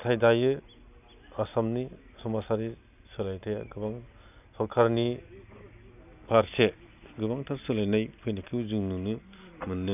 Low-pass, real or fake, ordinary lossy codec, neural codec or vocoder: 3.6 kHz; real; none; none